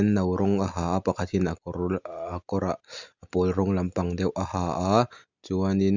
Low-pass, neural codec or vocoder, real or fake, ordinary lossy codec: 7.2 kHz; none; real; Opus, 64 kbps